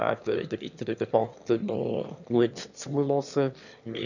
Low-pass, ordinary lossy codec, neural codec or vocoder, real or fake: 7.2 kHz; none; autoencoder, 22.05 kHz, a latent of 192 numbers a frame, VITS, trained on one speaker; fake